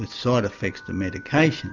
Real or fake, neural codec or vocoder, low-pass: real; none; 7.2 kHz